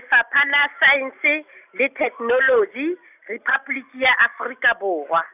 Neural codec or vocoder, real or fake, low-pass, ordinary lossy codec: none; real; 3.6 kHz; none